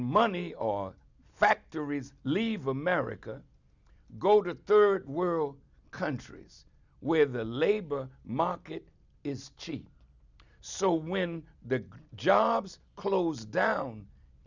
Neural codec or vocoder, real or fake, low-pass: none; real; 7.2 kHz